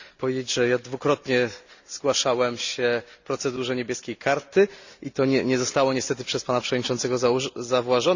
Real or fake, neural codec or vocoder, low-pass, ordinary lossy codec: real; none; 7.2 kHz; Opus, 64 kbps